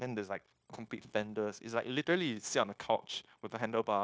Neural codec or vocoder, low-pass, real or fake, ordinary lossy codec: codec, 16 kHz, 0.9 kbps, LongCat-Audio-Codec; none; fake; none